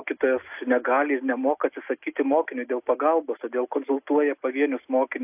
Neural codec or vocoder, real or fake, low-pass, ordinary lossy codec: none; real; 3.6 kHz; AAC, 32 kbps